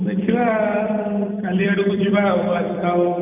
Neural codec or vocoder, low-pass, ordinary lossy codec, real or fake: vocoder, 44.1 kHz, 128 mel bands every 256 samples, BigVGAN v2; 3.6 kHz; none; fake